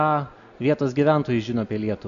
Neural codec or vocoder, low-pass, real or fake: none; 7.2 kHz; real